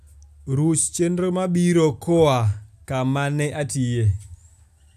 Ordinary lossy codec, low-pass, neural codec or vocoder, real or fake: none; 14.4 kHz; none; real